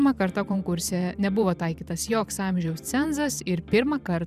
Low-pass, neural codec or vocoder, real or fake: 14.4 kHz; vocoder, 44.1 kHz, 128 mel bands every 512 samples, BigVGAN v2; fake